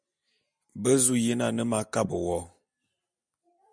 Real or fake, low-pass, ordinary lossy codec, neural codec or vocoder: real; 9.9 kHz; MP3, 96 kbps; none